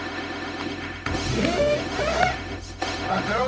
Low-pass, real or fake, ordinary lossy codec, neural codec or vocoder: none; fake; none; codec, 16 kHz, 0.4 kbps, LongCat-Audio-Codec